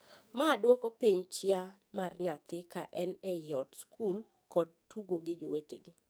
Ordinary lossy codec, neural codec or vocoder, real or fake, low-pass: none; codec, 44.1 kHz, 2.6 kbps, SNAC; fake; none